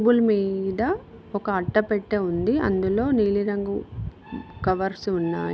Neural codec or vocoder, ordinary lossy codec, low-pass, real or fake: none; none; none; real